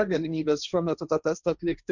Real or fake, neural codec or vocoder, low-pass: fake; codec, 16 kHz, 1.1 kbps, Voila-Tokenizer; 7.2 kHz